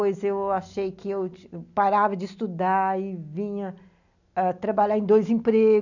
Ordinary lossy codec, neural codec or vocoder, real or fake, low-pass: none; none; real; 7.2 kHz